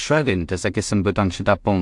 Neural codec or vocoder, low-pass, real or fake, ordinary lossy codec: codec, 16 kHz in and 24 kHz out, 0.4 kbps, LongCat-Audio-Codec, two codebook decoder; 10.8 kHz; fake; AAC, 64 kbps